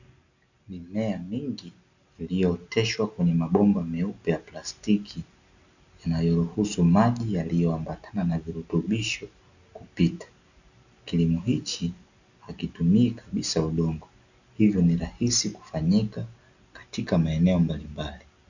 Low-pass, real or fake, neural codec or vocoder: 7.2 kHz; real; none